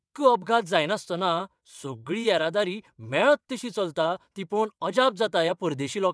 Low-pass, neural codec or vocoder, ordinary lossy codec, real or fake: none; vocoder, 22.05 kHz, 80 mel bands, WaveNeXt; none; fake